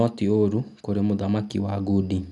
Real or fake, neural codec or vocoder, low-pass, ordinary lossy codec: real; none; 10.8 kHz; MP3, 96 kbps